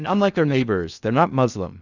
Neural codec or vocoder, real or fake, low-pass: codec, 16 kHz in and 24 kHz out, 0.6 kbps, FocalCodec, streaming, 2048 codes; fake; 7.2 kHz